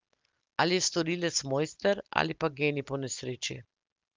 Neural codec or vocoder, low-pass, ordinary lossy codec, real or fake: none; 7.2 kHz; Opus, 24 kbps; real